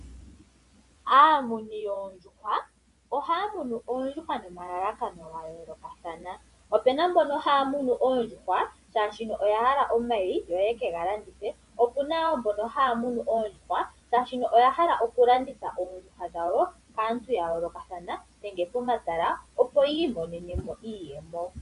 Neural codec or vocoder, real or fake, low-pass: vocoder, 24 kHz, 100 mel bands, Vocos; fake; 10.8 kHz